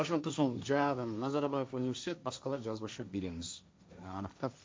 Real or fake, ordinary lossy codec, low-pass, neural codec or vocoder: fake; none; none; codec, 16 kHz, 1.1 kbps, Voila-Tokenizer